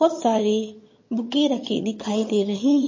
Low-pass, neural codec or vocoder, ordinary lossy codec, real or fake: 7.2 kHz; vocoder, 22.05 kHz, 80 mel bands, HiFi-GAN; MP3, 32 kbps; fake